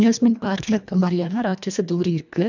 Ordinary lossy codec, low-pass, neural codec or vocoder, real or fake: none; 7.2 kHz; codec, 24 kHz, 1.5 kbps, HILCodec; fake